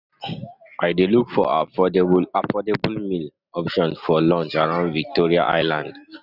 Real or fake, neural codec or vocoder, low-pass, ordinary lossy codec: real; none; 5.4 kHz; none